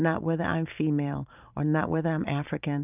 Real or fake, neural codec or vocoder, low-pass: fake; vocoder, 44.1 kHz, 128 mel bands every 512 samples, BigVGAN v2; 3.6 kHz